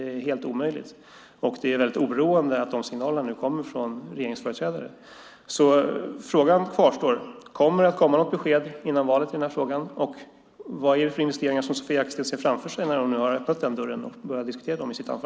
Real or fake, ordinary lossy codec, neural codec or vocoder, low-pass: real; none; none; none